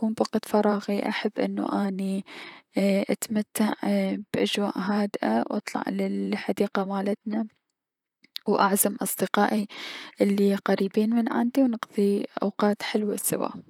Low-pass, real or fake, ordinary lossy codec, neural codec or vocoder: 19.8 kHz; fake; none; vocoder, 44.1 kHz, 128 mel bands, Pupu-Vocoder